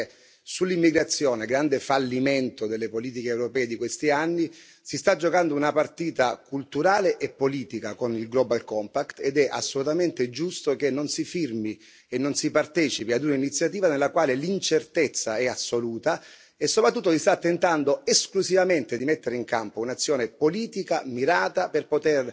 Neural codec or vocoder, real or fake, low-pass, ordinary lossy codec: none; real; none; none